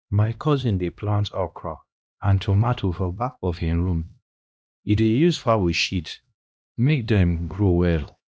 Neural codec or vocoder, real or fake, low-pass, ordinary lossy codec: codec, 16 kHz, 1 kbps, X-Codec, HuBERT features, trained on LibriSpeech; fake; none; none